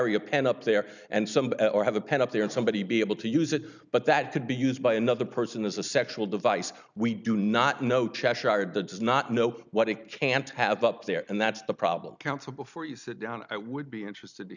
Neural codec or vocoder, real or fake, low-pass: none; real; 7.2 kHz